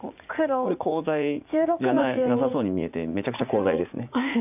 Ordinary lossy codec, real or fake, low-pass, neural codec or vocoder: none; fake; 3.6 kHz; vocoder, 44.1 kHz, 80 mel bands, Vocos